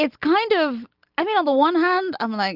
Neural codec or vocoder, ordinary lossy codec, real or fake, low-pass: none; Opus, 32 kbps; real; 5.4 kHz